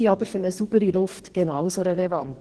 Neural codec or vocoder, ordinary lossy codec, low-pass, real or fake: codec, 44.1 kHz, 2.6 kbps, DAC; Opus, 16 kbps; 10.8 kHz; fake